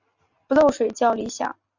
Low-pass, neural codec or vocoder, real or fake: 7.2 kHz; none; real